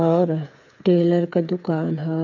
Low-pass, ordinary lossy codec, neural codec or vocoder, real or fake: 7.2 kHz; none; codec, 16 kHz, 8 kbps, FreqCodec, smaller model; fake